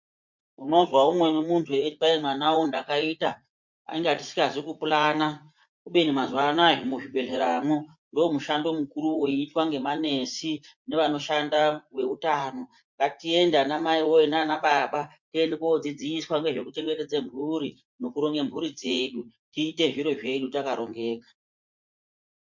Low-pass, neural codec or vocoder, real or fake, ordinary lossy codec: 7.2 kHz; vocoder, 44.1 kHz, 80 mel bands, Vocos; fake; MP3, 48 kbps